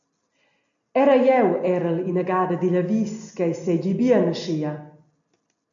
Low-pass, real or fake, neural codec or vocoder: 7.2 kHz; real; none